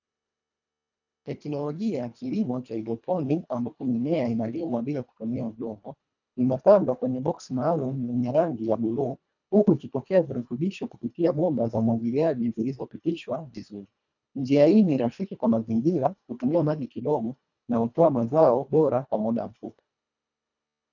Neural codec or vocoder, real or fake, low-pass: codec, 24 kHz, 1.5 kbps, HILCodec; fake; 7.2 kHz